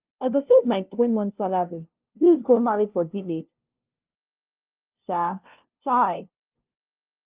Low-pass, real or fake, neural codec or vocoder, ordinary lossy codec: 3.6 kHz; fake; codec, 16 kHz, 0.5 kbps, FunCodec, trained on LibriTTS, 25 frames a second; Opus, 32 kbps